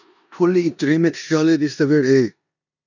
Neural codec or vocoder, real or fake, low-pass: codec, 16 kHz in and 24 kHz out, 0.9 kbps, LongCat-Audio-Codec, four codebook decoder; fake; 7.2 kHz